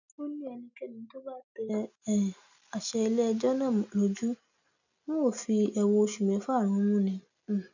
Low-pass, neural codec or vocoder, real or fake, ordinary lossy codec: 7.2 kHz; none; real; none